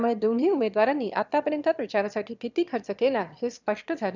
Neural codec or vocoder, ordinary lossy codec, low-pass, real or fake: autoencoder, 22.05 kHz, a latent of 192 numbers a frame, VITS, trained on one speaker; none; 7.2 kHz; fake